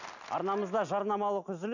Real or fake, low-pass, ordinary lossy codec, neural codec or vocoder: real; 7.2 kHz; none; none